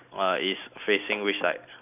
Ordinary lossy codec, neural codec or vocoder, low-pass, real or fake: none; none; 3.6 kHz; real